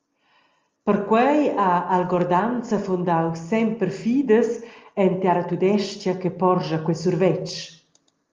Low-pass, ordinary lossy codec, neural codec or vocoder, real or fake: 7.2 kHz; Opus, 32 kbps; none; real